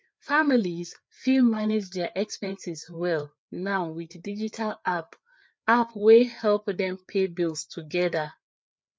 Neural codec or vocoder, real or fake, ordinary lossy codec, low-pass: codec, 16 kHz, 4 kbps, FreqCodec, larger model; fake; none; none